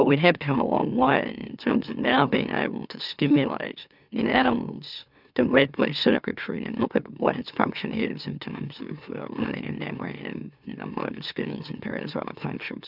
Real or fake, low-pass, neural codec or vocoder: fake; 5.4 kHz; autoencoder, 44.1 kHz, a latent of 192 numbers a frame, MeloTTS